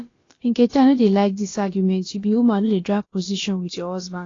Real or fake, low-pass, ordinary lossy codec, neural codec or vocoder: fake; 7.2 kHz; AAC, 32 kbps; codec, 16 kHz, about 1 kbps, DyCAST, with the encoder's durations